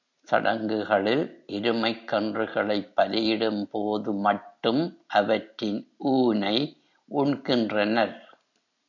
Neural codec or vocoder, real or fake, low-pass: none; real; 7.2 kHz